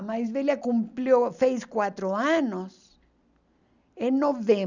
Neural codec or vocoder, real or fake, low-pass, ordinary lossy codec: none; real; 7.2 kHz; none